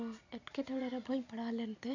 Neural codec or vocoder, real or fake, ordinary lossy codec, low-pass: none; real; none; 7.2 kHz